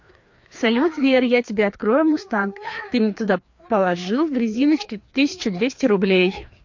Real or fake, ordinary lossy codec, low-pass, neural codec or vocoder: fake; MP3, 48 kbps; 7.2 kHz; codec, 16 kHz, 2 kbps, FreqCodec, larger model